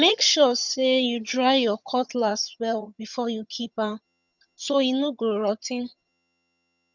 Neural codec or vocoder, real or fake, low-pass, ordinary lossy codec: vocoder, 22.05 kHz, 80 mel bands, HiFi-GAN; fake; 7.2 kHz; none